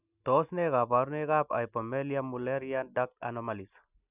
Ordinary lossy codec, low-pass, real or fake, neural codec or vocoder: none; 3.6 kHz; real; none